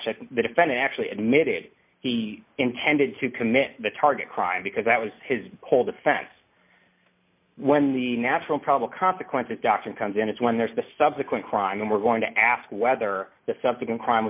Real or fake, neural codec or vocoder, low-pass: real; none; 3.6 kHz